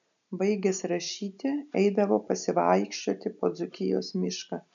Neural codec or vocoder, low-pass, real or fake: none; 7.2 kHz; real